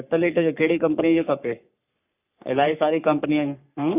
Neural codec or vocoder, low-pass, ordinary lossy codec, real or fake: codec, 44.1 kHz, 3.4 kbps, Pupu-Codec; 3.6 kHz; none; fake